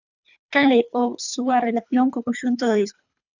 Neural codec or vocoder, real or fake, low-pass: codec, 24 kHz, 3 kbps, HILCodec; fake; 7.2 kHz